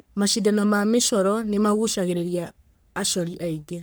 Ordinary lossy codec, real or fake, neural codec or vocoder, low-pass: none; fake; codec, 44.1 kHz, 3.4 kbps, Pupu-Codec; none